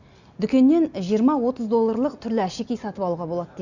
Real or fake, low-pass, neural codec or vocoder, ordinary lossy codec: real; 7.2 kHz; none; none